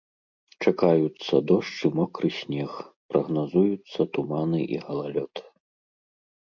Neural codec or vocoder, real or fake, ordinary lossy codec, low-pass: none; real; MP3, 64 kbps; 7.2 kHz